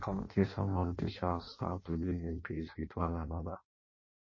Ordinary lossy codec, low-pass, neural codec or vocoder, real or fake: MP3, 32 kbps; 7.2 kHz; codec, 16 kHz in and 24 kHz out, 0.6 kbps, FireRedTTS-2 codec; fake